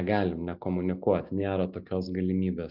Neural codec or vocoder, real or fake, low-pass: none; real; 5.4 kHz